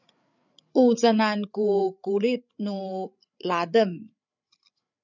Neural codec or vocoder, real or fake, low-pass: codec, 16 kHz, 8 kbps, FreqCodec, larger model; fake; 7.2 kHz